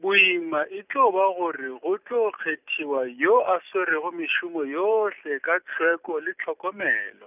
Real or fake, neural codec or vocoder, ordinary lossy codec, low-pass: real; none; none; 3.6 kHz